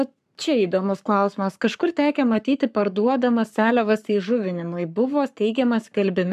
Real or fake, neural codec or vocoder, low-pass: fake; codec, 44.1 kHz, 7.8 kbps, Pupu-Codec; 14.4 kHz